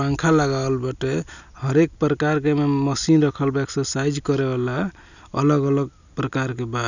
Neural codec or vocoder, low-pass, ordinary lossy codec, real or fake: none; 7.2 kHz; none; real